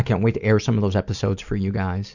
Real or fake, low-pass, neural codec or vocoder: real; 7.2 kHz; none